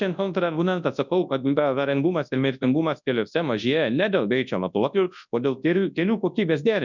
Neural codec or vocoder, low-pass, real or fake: codec, 24 kHz, 0.9 kbps, WavTokenizer, large speech release; 7.2 kHz; fake